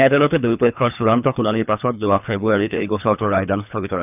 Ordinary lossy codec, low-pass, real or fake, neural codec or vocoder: none; 3.6 kHz; fake; codec, 24 kHz, 3 kbps, HILCodec